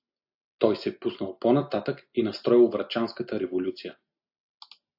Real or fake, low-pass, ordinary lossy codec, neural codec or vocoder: real; 5.4 kHz; AAC, 48 kbps; none